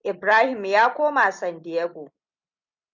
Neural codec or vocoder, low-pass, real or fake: none; 7.2 kHz; real